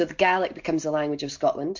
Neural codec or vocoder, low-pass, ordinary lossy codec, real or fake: none; 7.2 kHz; MP3, 48 kbps; real